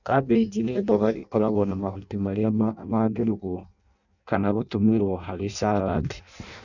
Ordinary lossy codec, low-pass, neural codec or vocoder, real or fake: none; 7.2 kHz; codec, 16 kHz in and 24 kHz out, 0.6 kbps, FireRedTTS-2 codec; fake